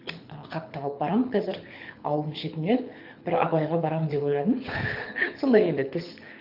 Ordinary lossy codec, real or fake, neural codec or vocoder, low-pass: MP3, 48 kbps; fake; codec, 24 kHz, 6 kbps, HILCodec; 5.4 kHz